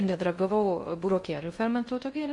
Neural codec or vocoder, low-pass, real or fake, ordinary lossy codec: codec, 16 kHz in and 24 kHz out, 0.6 kbps, FocalCodec, streaming, 2048 codes; 10.8 kHz; fake; MP3, 48 kbps